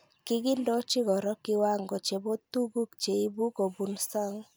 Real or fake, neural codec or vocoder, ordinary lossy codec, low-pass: real; none; none; none